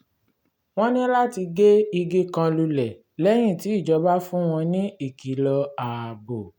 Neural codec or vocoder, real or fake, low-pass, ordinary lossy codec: none; real; 19.8 kHz; none